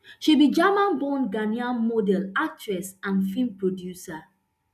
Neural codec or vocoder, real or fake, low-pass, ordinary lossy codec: none; real; 14.4 kHz; none